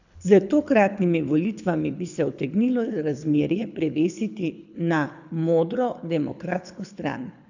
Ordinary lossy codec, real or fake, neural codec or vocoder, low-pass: none; fake; codec, 24 kHz, 6 kbps, HILCodec; 7.2 kHz